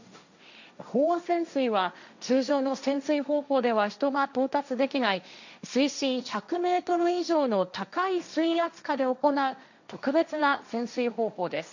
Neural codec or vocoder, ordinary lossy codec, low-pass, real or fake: codec, 16 kHz, 1.1 kbps, Voila-Tokenizer; none; 7.2 kHz; fake